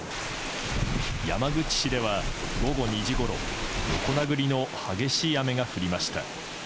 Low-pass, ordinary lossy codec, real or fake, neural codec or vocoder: none; none; real; none